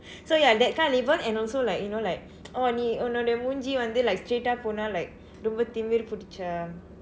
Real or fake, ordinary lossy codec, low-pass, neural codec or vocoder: real; none; none; none